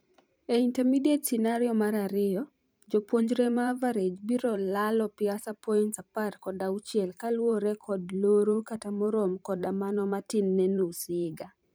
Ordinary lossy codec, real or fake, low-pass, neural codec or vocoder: none; fake; none; vocoder, 44.1 kHz, 128 mel bands every 512 samples, BigVGAN v2